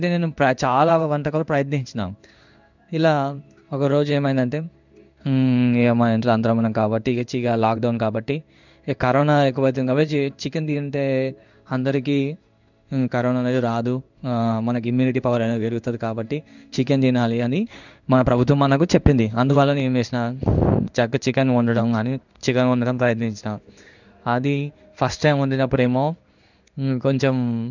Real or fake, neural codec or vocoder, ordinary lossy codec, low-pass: fake; codec, 16 kHz in and 24 kHz out, 1 kbps, XY-Tokenizer; none; 7.2 kHz